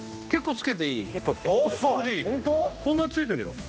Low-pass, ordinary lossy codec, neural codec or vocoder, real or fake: none; none; codec, 16 kHz, 1 kbps, X-Codec, HuBERT features, trained on general audio; fake